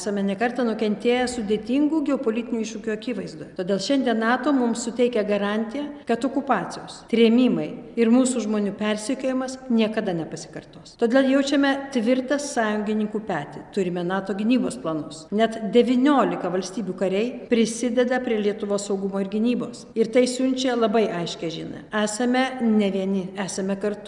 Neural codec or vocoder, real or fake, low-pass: none; real; 10.8 kHz